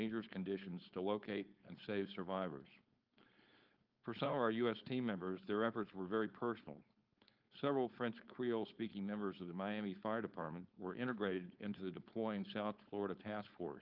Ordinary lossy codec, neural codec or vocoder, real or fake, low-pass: Opus, 24 kbps; codec, 16 kHz, 4.8 kbps, FACodec; fake; 5.4 kHz